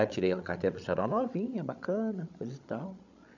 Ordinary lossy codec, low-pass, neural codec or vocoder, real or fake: none; 7.2 kHz; codec, 16 kHz, 16 kbps, FreqCodec, larger model; fake